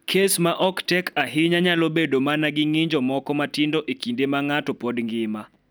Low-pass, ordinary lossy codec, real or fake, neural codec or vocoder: none; none; real; none